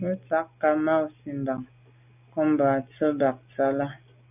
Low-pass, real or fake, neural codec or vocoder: 3.6 kHz; real; none